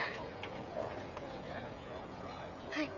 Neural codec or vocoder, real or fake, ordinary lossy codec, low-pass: codec, 16 kHz, 16 kbps, FreqCodec, smaller model; fake; none; 7.2 kHz